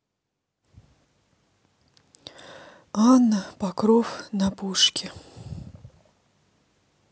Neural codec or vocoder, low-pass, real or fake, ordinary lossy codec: none; none; real; none